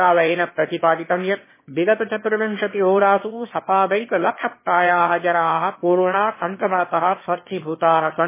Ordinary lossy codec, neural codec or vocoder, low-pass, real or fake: MP3, 16 kbps; autoencoder, 22.05 kHz, a latent of 192 numbers a frame, VITS, trained on one speaker; 3.6 kHz; fake